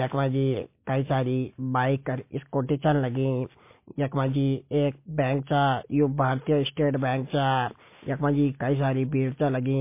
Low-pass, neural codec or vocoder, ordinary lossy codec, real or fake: 3.6 kHz; none; MP3, 24 kbps; real